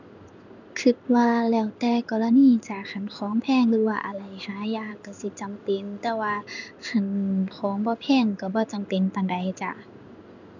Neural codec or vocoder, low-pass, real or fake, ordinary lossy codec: codec, 16 kHz in and 24 kHz out, 1 kbps, XY-Tokenizer; 7.2 kHz; fake; none